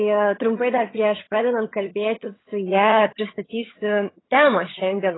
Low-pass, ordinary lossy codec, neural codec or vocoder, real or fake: 7.2 kHz; AAC, 16 kbps; vocoder, 22.05 kHz, 80 mel bands, HiFi-GAN; fake